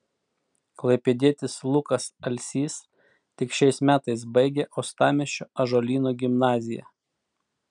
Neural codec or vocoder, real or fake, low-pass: none; real; 9.9 kHz